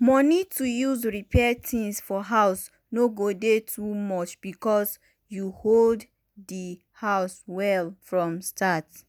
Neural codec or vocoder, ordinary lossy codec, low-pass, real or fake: none; none; none; real